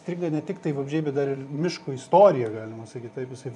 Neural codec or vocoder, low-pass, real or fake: vocoder, 24 kHz, 100 mel bands, Vocos; 10.8 kHz; fake